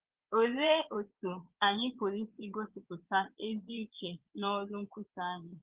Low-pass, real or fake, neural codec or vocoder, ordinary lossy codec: 3.6 kHz; fake; vocoder, 22.05 kHz, 80 mel bands, Vocos; Opus, 16 kbps